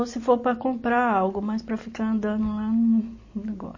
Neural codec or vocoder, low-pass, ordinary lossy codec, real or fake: none; 7.2 kHz; MP3, 32 kbps; real